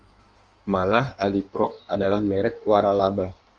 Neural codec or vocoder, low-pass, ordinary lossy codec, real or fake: codec, 16 kHz in and 24 kHz out, 1.1 kbps, FireRedTTS-2 codec; 9.9 kHz; Opus, 32 kbps; fake